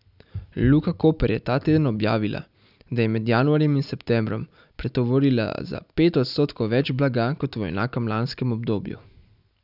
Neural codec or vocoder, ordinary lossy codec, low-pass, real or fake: none; none; 5.4 kHz; real